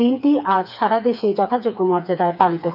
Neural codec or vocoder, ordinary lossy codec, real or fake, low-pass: codec, 16 kHz, 4 kbps, FreqCodec, smaller model; none; fake; 5.4 kHz